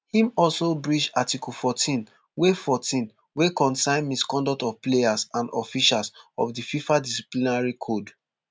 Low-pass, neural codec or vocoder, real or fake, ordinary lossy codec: none; none; real; none